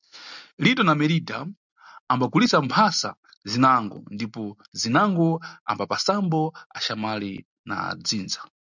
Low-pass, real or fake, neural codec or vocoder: 7.2 kHz; real; none